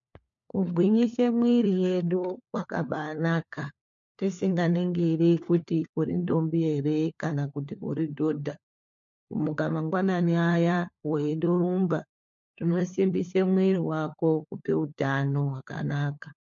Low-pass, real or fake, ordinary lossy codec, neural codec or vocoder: 7.2 kHz; fake; MP3, 48 kbps; codec, 16 kHz, 4 kbps, FunCodec, trained on LibriTTS, 50 frames a second